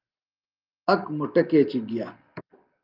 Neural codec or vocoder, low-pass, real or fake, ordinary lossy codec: none; 5.4 kHz; real; Opus, 32 kbps